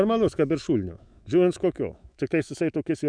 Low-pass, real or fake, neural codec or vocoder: 9.9 kHz; fake; autoencoder, 48 kHz, 128 numbers a frame, DAC-VAE, trained on Japanese speech